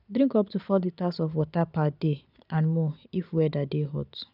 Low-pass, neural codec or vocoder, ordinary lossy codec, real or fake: 5.4 kHz; none; none; real